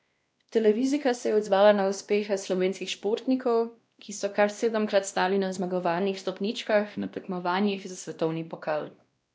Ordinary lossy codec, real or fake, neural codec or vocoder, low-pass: none; fake; codec, 16 kHz, 1 kbps, X-Codec, WavLM features, trained on Multilingual LibriSpeech; none